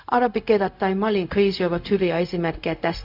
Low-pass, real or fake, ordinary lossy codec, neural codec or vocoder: 5.4 kHz; fake; none; codec, 16 kHz, 0.4 kbps, LongCat-Audio-Codec